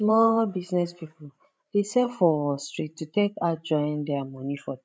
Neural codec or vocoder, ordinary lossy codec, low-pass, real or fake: codec, 16 kHz, 16 kbps, FreqCodec, larger model; none; none; fake